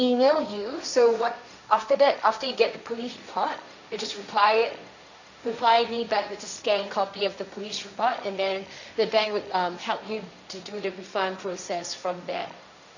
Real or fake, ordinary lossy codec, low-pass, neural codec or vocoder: fake; none; 7.2 kHz; codec, 16 kHz, 1.1 kbps, Voila-Tokenizer